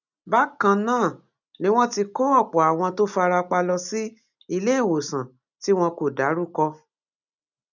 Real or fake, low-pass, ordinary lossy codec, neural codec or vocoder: real; 7.2 kHz; none; none